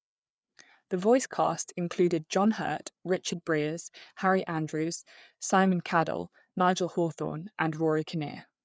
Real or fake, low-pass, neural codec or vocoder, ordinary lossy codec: fake; none; codec, 16 kHz, 4 kbps, FreqCodec, larger model; none